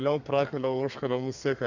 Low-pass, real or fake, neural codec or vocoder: 7.2 kHz; fake; codec, 32 kHz, 1.9 kbps, SNAC